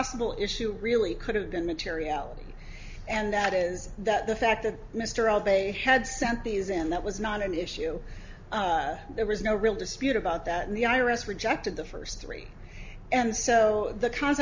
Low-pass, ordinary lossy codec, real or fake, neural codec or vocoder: 7.2 kHz; MP3, 64 kbps; real; none